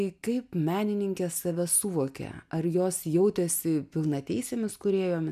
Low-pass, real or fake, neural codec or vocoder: 14.4 kHz; real; none